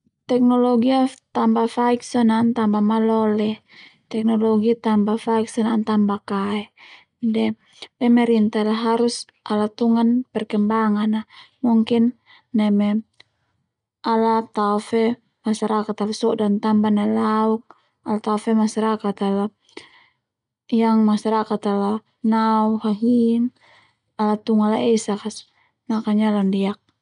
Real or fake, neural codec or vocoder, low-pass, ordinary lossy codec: real; none; 10.8 kHz; none